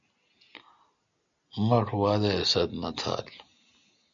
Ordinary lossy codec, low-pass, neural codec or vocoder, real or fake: MP3, 64 kbps; 7.2 kHz; none; real